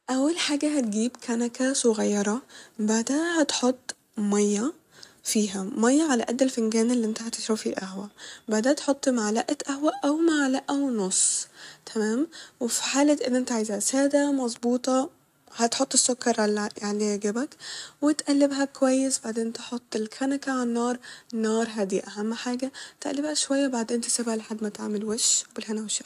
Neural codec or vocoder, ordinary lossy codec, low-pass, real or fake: none; AAC, 96 kbps; 14.4 kHz; real